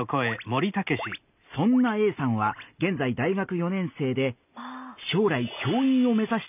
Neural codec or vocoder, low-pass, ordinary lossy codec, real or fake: none; 3.6 kHz; none; real